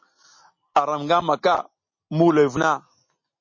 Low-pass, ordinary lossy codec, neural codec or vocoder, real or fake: 7.2 kHz; MP3, 32 kbps; none; real